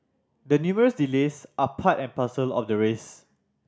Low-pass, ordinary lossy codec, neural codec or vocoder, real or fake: none; none; none; real